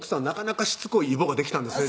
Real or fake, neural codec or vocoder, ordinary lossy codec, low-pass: real; none; none; none